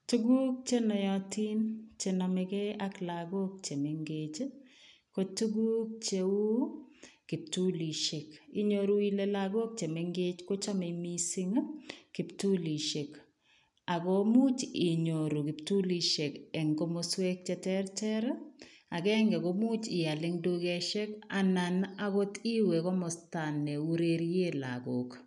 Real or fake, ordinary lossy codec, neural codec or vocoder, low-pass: real; none; none; 10.8 kHz